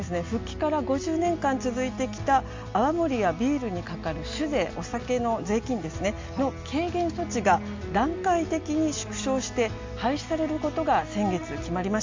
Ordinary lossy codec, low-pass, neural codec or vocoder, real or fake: MP3, 48 kbps; 7.2 kHz; none; real